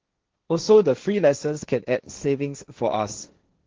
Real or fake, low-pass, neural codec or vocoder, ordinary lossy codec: fake; 7.2 kHz; codec, 16 kHz, 1.1 kbps, Voila-Tokenizer; Opus, 16 kbps